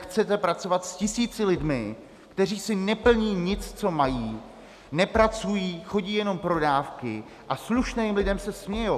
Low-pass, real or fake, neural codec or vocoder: 14.4 kHz; real; none